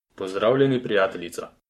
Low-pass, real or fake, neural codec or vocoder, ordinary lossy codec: 19.8 kHz; fake; codec, 44.1 kHz, 7.8 kbps, Pupu-Codec; MP3, 64 kbps